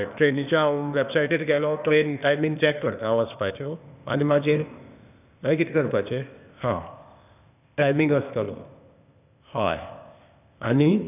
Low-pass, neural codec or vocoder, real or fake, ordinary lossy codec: 3.6 kHz; codec, 16 kHz, 0.8 kbps, ZipCodec; fake; none